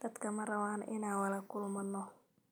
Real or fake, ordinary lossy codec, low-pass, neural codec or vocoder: real; none; none; none